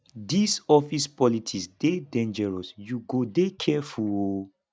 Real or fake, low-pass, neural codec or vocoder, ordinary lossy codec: real; none; none; none